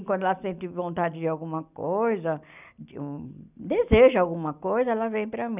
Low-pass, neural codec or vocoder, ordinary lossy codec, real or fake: 3.6 kHz; none; none; real